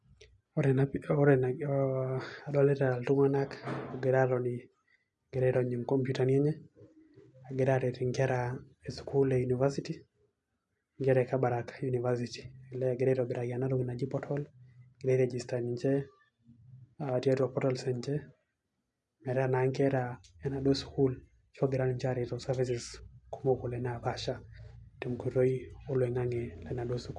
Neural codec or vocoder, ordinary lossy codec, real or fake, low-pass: none; none; real; 10.8 kHz